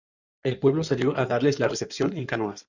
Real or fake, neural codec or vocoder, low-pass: fake; codec, 16 kHz in and 24 kHz out, 2.2 kbps, FireRedTTS-2 codec; 7.2 kHz